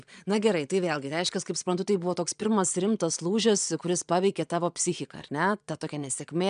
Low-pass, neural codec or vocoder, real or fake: 9.9 kHz; vocoder, 22.05 kHz, 80 mel bands, Vocos; fake